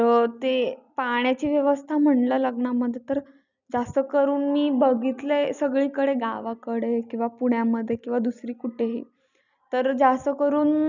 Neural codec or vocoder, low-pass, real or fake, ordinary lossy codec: none; 7.2 kHz; real; none